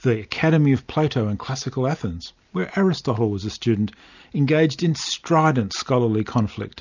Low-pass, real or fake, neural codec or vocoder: 7.2 kHz; real; none